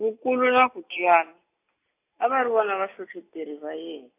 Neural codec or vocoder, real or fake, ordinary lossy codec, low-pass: none; real; AAC, 24 kbps; 3.6 kHz